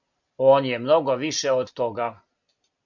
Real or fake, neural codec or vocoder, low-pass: real; none; 7.2 kHz